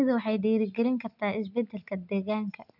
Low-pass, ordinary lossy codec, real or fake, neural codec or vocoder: 5.4 kHz; none; real; none